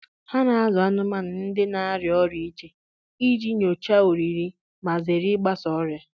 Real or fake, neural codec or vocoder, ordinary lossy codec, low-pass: real; none; none; none